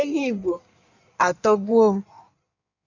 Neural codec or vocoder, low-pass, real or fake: codec, 16 kHz in and 24 kHz out, 1.1 kbps, FireRedTTS-2 codec; 7.2 kHz; fake